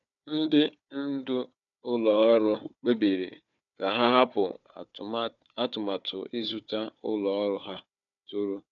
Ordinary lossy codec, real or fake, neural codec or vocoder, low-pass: none; fake; codec, 16 kHz, 4 kbps, FunCodec, trained on Chinese and English, 50 frames a second; 7.2 kHz